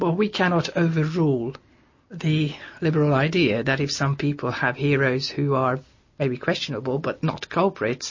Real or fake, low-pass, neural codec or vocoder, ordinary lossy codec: real; 7.2 kHz; none; MP3, 32 kbps